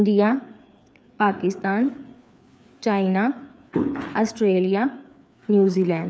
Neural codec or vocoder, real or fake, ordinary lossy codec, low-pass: codec, 16 kHz, 4 kbps, FreqCodec, larger model; fake; none; none